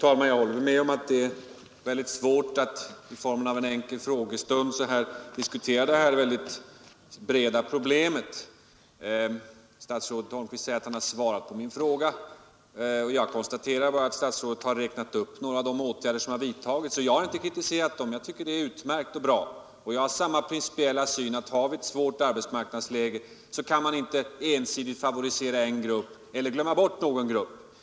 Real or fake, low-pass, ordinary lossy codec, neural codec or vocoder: real; none; none; none